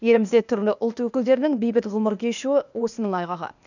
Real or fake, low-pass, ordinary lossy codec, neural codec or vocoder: fake; 7.2 kHz; none; codec, 16 kHz, 0.8 kbps, ZipCodec